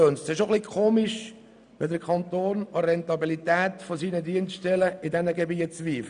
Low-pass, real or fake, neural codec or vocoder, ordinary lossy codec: 9.9 kHz; real; none; none